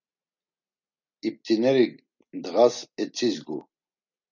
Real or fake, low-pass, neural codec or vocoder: real; 7.2 kHz; none